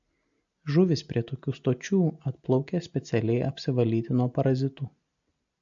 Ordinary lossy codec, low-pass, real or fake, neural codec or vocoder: AAC, 64 kbps; 7.2 kHz; real; none